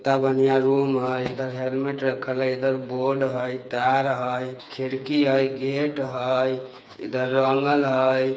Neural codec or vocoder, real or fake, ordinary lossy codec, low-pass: codec, 16 kHz, 4 kbps, FreqCodec, smaller model; fake; none; none